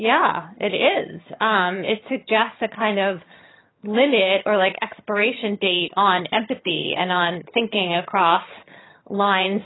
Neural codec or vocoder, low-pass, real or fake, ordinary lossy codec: vocoder, 22.05 kHz, 80 mel bands, HiFi-GAN; 7.2 kHz; fake; AAC, 16 kbps